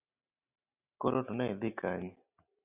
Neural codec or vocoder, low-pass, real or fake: none; 3.6 kHz; real